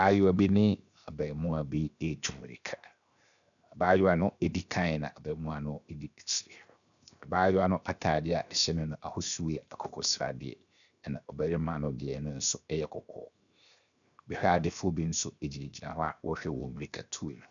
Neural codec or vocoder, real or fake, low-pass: codec, 16 kHz, 0.7 kbps, FocalCodec; fake; 7.2 kHz